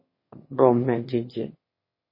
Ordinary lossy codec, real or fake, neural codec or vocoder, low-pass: MP3, 24 kbps; fake; autoencoder, 22.05 kHz, a latent of 192 numbers a frame, VITS, trained on one speaker; 5.4 kHz